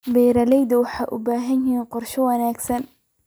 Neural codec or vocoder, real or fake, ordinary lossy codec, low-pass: none; real; none; none